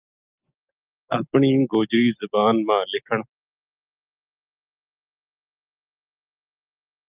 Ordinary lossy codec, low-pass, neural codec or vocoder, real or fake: Opus, 32 kbps; 3.6 kHz; none; real